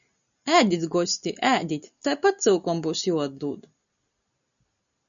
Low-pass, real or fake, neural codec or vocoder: 7.2 kHz; real; none